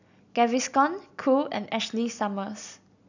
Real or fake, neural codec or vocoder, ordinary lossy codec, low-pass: real; none; none; 7.2 kHz